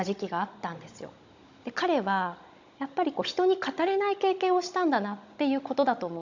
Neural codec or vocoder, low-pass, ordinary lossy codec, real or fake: codec, 16 kHz, 16 kbps, FunCodec, trained on Chinese and English, 50 frames a second; 7.2 kHz; MP3, 64 kbps; fake